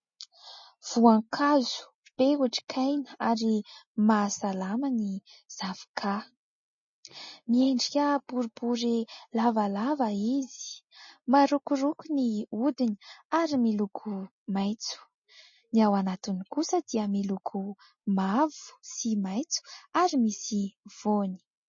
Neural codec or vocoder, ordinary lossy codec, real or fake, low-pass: none; MP3, 32 kbps; real; 7.2 kHz